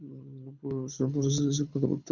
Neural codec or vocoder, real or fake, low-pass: codec, 24 kHz, 6 kbps, HILCodec; fake; 7.2 kHz